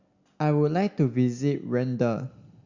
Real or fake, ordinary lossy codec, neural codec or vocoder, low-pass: real; Opus, 64 kbps; none; 7.2 kHz